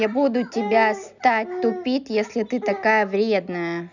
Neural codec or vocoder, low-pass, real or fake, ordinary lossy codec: none; 7.2 kHz; real; none